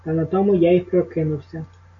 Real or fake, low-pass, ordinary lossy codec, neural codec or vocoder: real; 7.2 kHz; AAC, 48 kbps; none